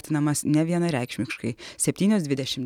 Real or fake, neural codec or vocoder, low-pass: real; none; 19.8 kHz